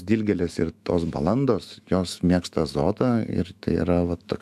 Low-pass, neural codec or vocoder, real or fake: 14.4 kHz; autoencoder, 48 kHz, 128 numbers a frame, DAC-VAE, trained on Japanese speech; fake